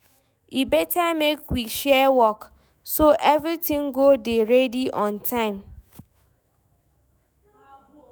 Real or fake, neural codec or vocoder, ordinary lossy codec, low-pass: fake; autoencoder, 48 kHz, 128 numbers a frame, DAC-VAE, trained on Japanese speech; none; none